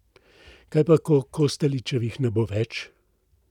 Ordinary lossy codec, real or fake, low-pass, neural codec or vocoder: none; real; 19.8 kHz; none